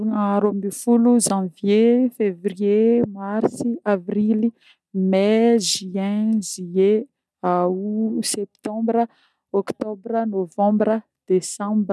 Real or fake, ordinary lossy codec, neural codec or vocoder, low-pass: real; none; none; none